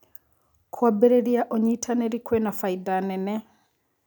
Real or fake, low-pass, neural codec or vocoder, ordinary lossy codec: real; none; none; none